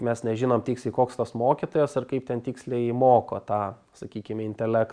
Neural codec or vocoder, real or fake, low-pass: none; real; 9.9 kHz